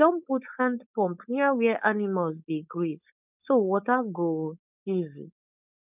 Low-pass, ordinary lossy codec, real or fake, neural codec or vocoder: 3.6 kHz; none; fake; codec, 16 kHz, 4.8 kbps, FACodec